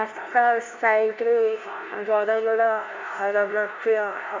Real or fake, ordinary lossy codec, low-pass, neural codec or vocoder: fake; MP3, 64 kbps; 7.2 kHz; codec, 16 kHz, 0.5 kbps, FunCodec, trained on LibriTTS, 25 frames a second